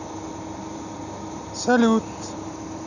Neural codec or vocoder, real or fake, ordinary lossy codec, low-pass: none; real; none; 7.2 kHz